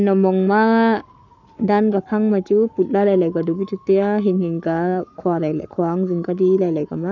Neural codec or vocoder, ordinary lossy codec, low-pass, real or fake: codec, 44.1 kHz, 7.8 kbps, Pupu-Codec; none; 7.2 kHz; fake